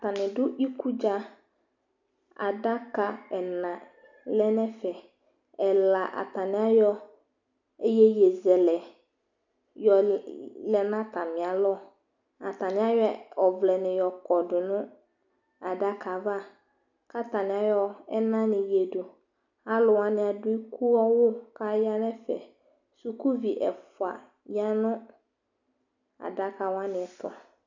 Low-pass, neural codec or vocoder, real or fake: 7.2 kHz; none; real